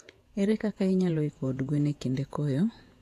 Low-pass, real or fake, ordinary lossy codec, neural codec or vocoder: 14.4 kHz; fake; AAC, 64 kbps; vocoder, 48 kHz, 128 mel bands, Vocos